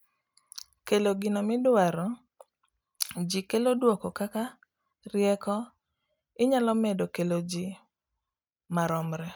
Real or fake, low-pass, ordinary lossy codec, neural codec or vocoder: real; none; none; none